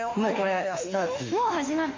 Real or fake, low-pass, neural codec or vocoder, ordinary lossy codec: fake; 7.2 kHz; autoencoder, 48 kHz, 32 numbers a frame, DAC-VAE, trained on Japanese speech; MP3, 48 kbps